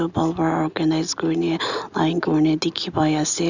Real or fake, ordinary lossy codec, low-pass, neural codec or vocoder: real; none; 7.2 kHz; none